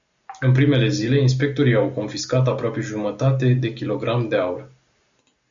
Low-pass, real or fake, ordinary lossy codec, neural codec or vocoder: 7.2 kHz; real; Opus, 64 kbps; none